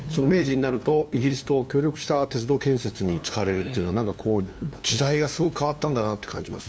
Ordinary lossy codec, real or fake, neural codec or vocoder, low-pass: none; fake; codec, 16 kHz, 2 kbps, FunCodec, trained on LibriTTS, 25 frames a second; none